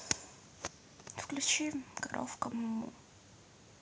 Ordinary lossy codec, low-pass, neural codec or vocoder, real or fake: none; none; none; real